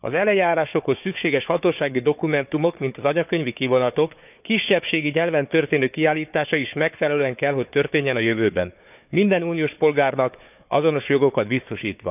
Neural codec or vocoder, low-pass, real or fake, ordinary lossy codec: codec, 16 kHz, 4 kbps, FunCodec, trained on Chinese and English, 50 frames a second; 3.6 kHz; fake; none